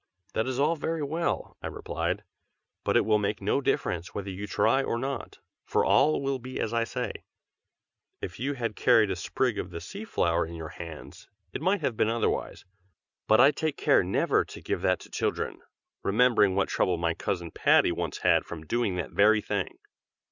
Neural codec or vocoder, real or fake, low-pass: none; real; 7.2 kHz